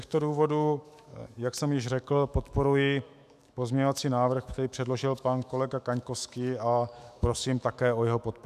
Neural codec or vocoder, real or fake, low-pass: autoencoder, 48 kHz, 128 numbers a frame, DAC-VAE, trained on Japanese speech; fake; 14.4 kHz